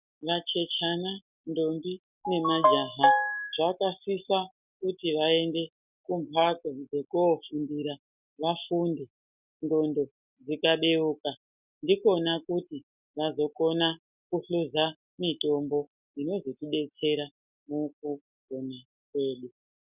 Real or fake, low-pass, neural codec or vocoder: real; 3.6 kHz; none